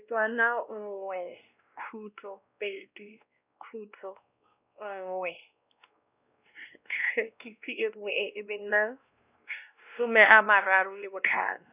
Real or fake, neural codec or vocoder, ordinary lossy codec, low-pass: fake; codec, 16 kHz, 1 kbps, X-Codec, WavLM features, trained on Multilingual LibriSpeech; none; 3.6 kHz